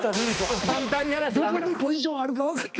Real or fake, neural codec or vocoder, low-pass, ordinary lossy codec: fake; codec, 16 kHz, 2 kbps, X-Codec, HuBERT features, trained on balanced general audio; none; none